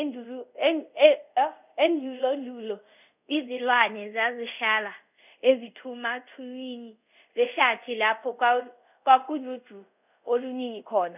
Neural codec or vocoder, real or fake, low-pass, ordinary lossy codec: codec, 24 kHz, 0.5 kbps, DualCodec; fake; 3.6 kHz; none